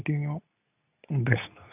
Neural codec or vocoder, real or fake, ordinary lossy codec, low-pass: codec, 24 kHz, 0.9 kbps, WavTokenizer, medium speech release version 2; fake; none; 3.6 kHz